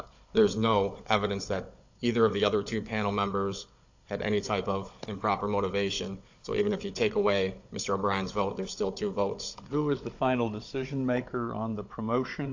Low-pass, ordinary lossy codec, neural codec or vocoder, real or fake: 7.2 kHz; AAC, 48 kbps; codec, 16 kHz, 16 kbps, FunCodec, trained on Chinese and English, 50 frames a second; fake